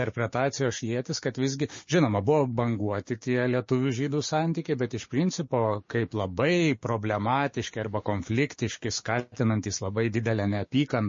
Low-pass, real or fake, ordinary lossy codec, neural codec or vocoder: 7.2 kHz; fake; MP3, 32 kbps; codec, 16 kHz, 6 kbps, DAC